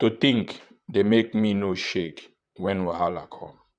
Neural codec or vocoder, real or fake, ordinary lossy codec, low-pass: vocoder, 44.1 kHz, 128 mel bands, Pupu-Vocoder; fake; none; 9.9 kHz